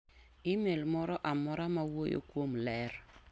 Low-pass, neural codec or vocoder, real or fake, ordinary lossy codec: none; none; real; none